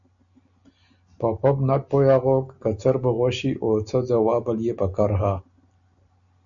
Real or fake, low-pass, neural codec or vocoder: real; 7.2 kHz; none